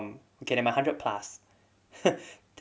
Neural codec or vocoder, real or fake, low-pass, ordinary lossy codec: none; real; none; none